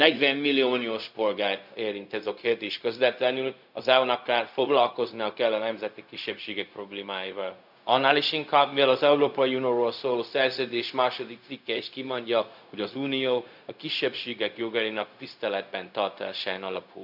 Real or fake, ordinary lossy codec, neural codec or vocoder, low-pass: fake; none; codec, 16 kHz, 0.4 kbps, LongCat-Audio-Codec; 5.4 kHz